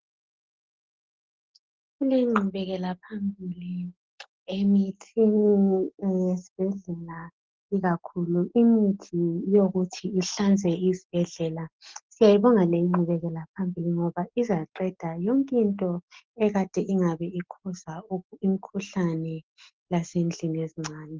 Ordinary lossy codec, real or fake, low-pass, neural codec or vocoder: Opus, 16 kbps; real; 7.2 kHz; none